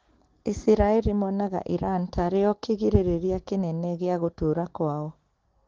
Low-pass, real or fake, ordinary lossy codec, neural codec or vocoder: 7.2 kHz; real; Opus, 16 kbps; none